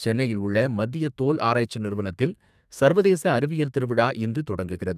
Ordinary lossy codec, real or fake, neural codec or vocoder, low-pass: none; fake; codec, 32 kHz, 1.9 kbps, SNAC; 14.4 kHz